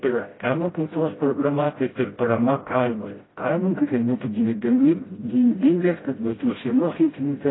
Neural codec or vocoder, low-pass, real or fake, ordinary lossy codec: codec, 16 kHz, 0.5 kbps, FreqCodec, smaller model; 7.2 kHz; fake; AAC, 16 kbps